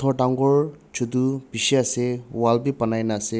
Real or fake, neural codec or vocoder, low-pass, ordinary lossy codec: real; none; none; none